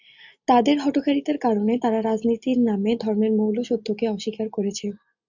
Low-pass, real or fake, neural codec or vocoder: 7.2 kHz; real; none